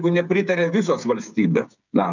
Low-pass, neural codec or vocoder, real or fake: 7.2 kHz; codec, 16 kHz, 8 kbps, FreqCodec, smaller model; fake